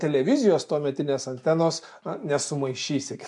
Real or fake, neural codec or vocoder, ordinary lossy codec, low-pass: real; none; MP3, 64 kbps; 10.8 kHz